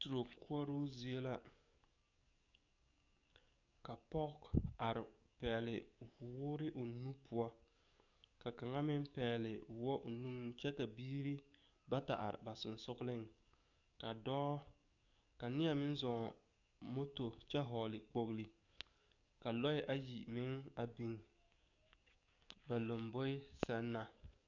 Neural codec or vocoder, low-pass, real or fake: codec, 44.1 kHz, 7.8 kbps, DAC; 7.2 kHz; fake